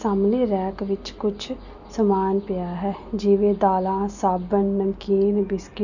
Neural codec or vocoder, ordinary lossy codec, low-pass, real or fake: none; none; 7.2 kHz; real